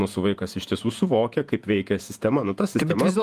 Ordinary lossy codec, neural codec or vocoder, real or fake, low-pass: Opus, 32 kbps; vocoder, 48 kHz, 128 mel bands, Vocos; fake; 14.4 kHz